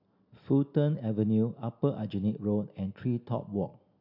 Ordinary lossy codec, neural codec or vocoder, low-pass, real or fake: AAC, 48 kbps; none; 5.4 kHz; real